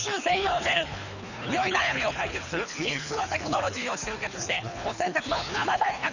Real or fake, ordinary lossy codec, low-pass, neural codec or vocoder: fake; none; 7.2 kHz; codec, 24 kHz, 3 kbps, HILCodec